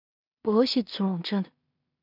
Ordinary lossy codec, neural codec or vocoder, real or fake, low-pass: none; codec, 16 kHz in and 24 kHz out, 0.4 kbps, LongCat-Audio-Codec, two codebook decoder; fake; 5.4 kHz